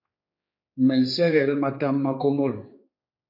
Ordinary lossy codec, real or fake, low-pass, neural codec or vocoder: MP3, 32 kbps; fake; 5.4 kHz; codec, 16 kHz, 4 kbps, X-Codec, HuBERT features, trained on general audio